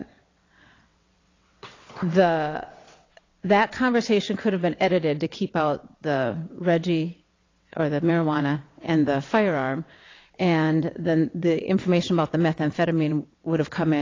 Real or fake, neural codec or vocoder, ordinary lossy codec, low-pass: fake; vocoder, 22.05 kHz, 80 mel bands, WaveNeXt; AAC, 32 kbps; 7.2 kHz